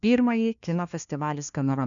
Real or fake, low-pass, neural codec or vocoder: fake; 7.2 kHz; codec, 16 kHz, 1 kbps, FunCodec, trained on LibriTTS, 50 frames a second